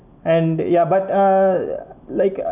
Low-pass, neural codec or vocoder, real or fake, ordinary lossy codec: 3.6 kHz; none; real; none